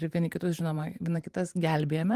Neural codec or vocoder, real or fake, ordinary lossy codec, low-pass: vocoder, 44.1 kHz, 128 mel bands every 512 samples, BigVGAN v2; fake; Opus, 24 kbps; 14.4 kHz